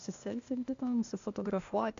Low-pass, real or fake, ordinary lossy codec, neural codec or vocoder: 7.2 kHz; fake; AAC, 64 kbps; codec, 16 kHz, 0.8 kbps, ZipCodec